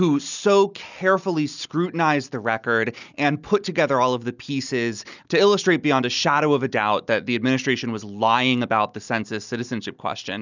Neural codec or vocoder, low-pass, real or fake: none; 7.2 kHz; real